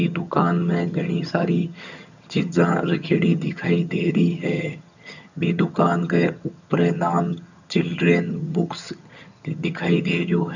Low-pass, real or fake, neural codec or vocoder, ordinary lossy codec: 7.2 kHz; fake; vocoder, 22.05 kHz, 80 mel bands, HiFi-GAN; none